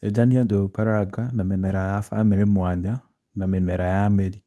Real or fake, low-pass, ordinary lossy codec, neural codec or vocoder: fake; none; none; codec, 24 kHz, 0.9 kbps, WavTokenizer, medium speech release version 2